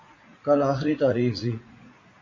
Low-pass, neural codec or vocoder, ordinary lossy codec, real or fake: 7.2 kHz; vocoder, 44.1 kHz, 80 mel bands, Vocos; MP3, 32 kbps; fake